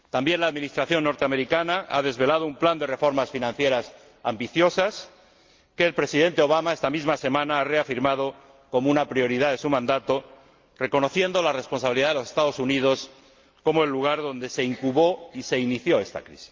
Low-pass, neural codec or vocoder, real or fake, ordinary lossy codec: 7.2 kHz; none; real; Opus, 24 kbps